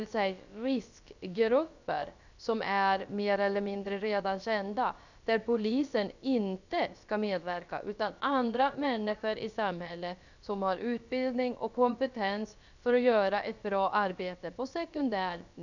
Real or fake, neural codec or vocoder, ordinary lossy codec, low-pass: fake; codec, 16 kHz, about 1 kbps, DyCAST, with the encoder's durations; none; 7.2 kHz